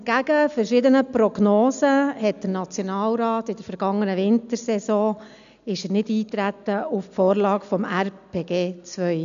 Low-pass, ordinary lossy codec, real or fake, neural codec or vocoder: 7.2 kHz; none; real; none